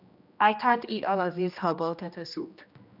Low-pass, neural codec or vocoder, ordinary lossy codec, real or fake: 5.4 kHz; codec, 16 kHz, 1 kbps, X-Codec, HuBERT features, trained on general audio; none; fake